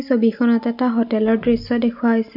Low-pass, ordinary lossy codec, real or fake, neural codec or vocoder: 5.4 kHz; none; real; none